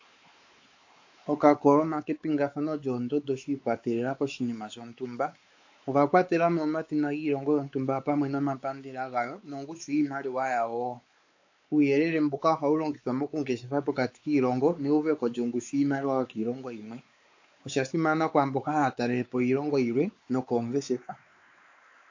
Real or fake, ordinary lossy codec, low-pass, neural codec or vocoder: fake; AAC, 48 kbps; 7.2 kHz; codec, 16 kHz, 4 kbps, X-Codec, WavLM features, trained on Multilingual LibriSpeech